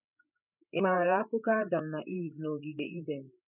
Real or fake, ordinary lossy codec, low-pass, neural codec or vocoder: fake; none; 3.6 kHz; codec, 16 kHz, 8 kbps, FreqCodec, larger model